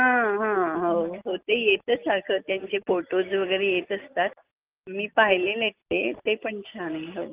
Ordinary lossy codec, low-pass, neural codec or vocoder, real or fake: Opus, 24 kbps; 3.6 kHz; none; real